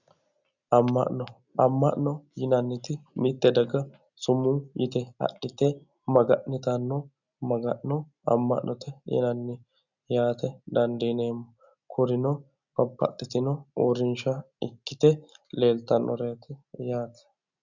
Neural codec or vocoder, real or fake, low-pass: none; real; 7.2 kHz